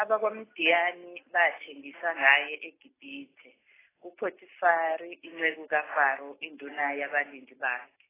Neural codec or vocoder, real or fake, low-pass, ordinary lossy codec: none; real; 3.6 kHz; AAC, 16 kbps